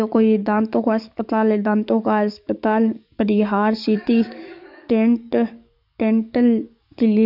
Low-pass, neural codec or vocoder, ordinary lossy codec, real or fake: 5.4 kHz; codec, 44.1 kHz, 7.8 kbps, DAC; none; fake